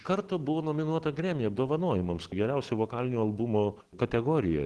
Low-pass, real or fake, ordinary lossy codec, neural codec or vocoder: 10.8 kHz; real; Opus, 16 kbps; none